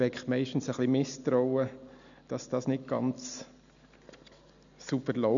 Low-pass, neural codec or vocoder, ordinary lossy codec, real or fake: 7.2 kHz; none; none; real